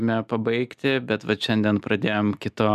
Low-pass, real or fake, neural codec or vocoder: 14.4 kHz; real; none